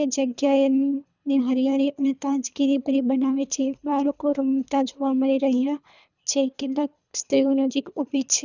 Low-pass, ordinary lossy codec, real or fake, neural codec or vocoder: 7.2 kHz; none; fake; codec, 24 kHz, 3 kbps, HILCodec